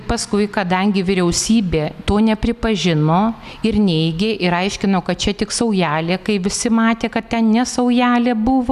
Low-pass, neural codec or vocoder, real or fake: 14.4 kHz; none; real